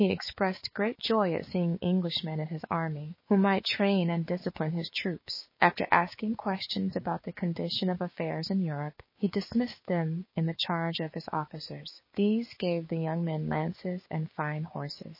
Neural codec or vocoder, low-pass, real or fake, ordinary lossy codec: codec, 16 kHz, 6 kbps, DAC; 5.4 kHz; fake; MP3, 24 kbps